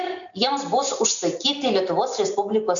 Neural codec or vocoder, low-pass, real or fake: none; 7.2 kHz; real